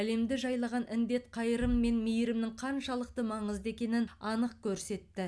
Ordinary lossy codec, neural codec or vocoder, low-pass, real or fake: none; none; none; real